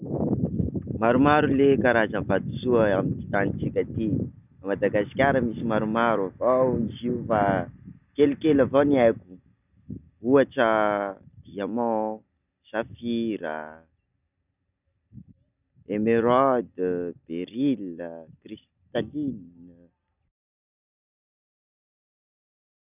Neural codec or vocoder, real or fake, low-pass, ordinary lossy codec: none; real; 3.6 kHz; none